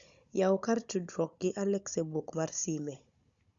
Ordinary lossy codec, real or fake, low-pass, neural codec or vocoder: Opus, 64 kbps; fake; 7.2 kHz; codec, 16 kHz, 16 kbps, FunCodec, trained on Chinese and English, 50 frames a second